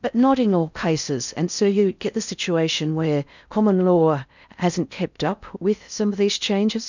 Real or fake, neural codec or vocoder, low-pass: fake; codec, 16 kHz in and 24 kHz out, 0.6 kbps, FocalCodec, streaming, 4096 codes; 7.2 kHz